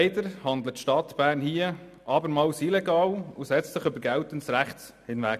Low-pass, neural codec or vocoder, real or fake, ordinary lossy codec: 14.4 kHz; none; real; none